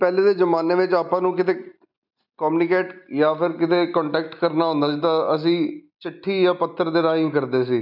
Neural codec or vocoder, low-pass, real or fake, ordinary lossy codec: none; 5.4 kHz; real; none